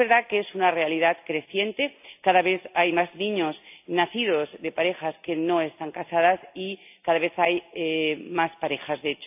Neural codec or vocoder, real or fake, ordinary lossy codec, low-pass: none; real; AAC, 32 kbps; 3.6 kHz